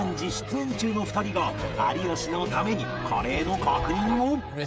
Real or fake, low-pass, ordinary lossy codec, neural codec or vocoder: fake; none; none; codec, 16 kHz, 16 kbps, FreqCodec, smaller model